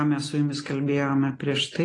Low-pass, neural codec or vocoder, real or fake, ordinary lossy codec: 10.8 kHz; none; real; AAC, 32 kbps